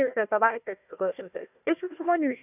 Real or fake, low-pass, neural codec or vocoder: fake; 3.6 kHz; codec, 16 kHz, 1 kbps, FunCodec, trained on Chinese and English, 50 frames a second